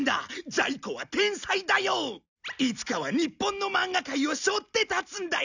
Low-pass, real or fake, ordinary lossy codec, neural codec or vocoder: 7.2 kHz; real; none; none